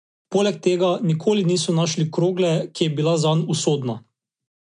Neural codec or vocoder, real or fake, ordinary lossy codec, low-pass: none; real; MP3, 64 kbps; 9.9 kHz